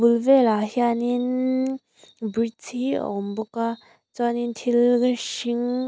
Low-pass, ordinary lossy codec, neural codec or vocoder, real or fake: none; none; none; real